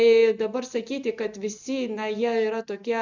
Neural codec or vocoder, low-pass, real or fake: none; 7.2 kHz; real